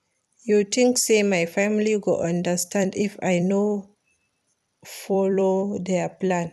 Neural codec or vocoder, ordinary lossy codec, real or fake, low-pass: vocoder, 44.1 kHz, 128 mel bands every 256 samples, BigVGAN v2; none; fake; 14.4 kHz